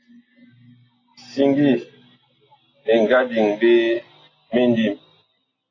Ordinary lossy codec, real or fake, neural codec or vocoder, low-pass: AAC, 32 kbps; real; none; 7.2 kHz